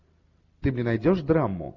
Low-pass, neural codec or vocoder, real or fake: 7.2 kHz; none; real